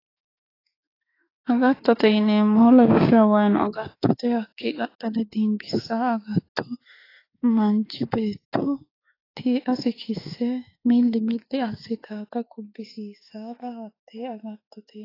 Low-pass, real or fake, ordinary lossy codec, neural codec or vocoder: 5.4 kHz; fake; AAC, 24 kbps; autoencoder, 48 kHz, 32 numbers a frame, DAC-VAE, trained on Japanese speech